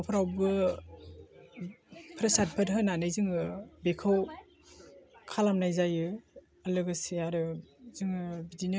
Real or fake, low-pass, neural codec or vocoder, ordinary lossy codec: real; none; none; none